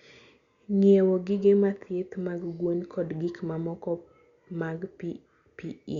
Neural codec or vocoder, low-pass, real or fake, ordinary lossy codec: none; 7.2 kHz; real; Opus, 64 kbps